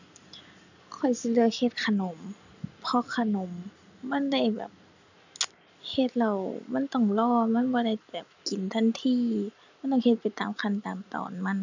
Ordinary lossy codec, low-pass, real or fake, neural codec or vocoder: none; 7.2 kHz; real; none